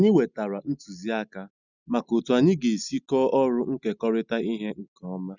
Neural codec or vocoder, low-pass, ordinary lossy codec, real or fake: none; 7.2 kHz; none; real